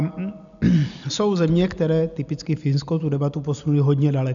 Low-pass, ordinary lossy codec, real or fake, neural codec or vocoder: 7.2 kHz; MP3, 96 kbps; real; none